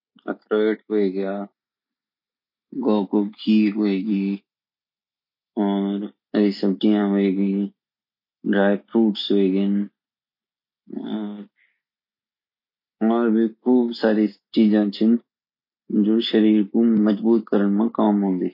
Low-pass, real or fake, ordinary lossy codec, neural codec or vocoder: 5.4 kHz; real; MP3, 48 kbps; none